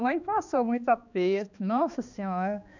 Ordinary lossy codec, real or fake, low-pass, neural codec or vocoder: none; fake; 7.2 kHz; codec, 16 kHz, 2 kbps, X-Codec, HuBERT features, trained on balanced general audio